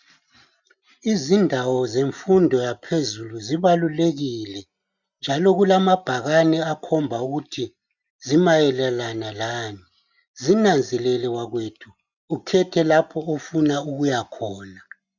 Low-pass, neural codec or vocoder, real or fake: 7.2 kHz; none; real